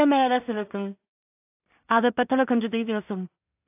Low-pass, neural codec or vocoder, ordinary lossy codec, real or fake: 3.6 kHz; codec, 16 kHz in and 24 kHz out, 0.4 kbps, LongCat-Audio-Codec, two codebook decoder; AAC, 32 kbps; fake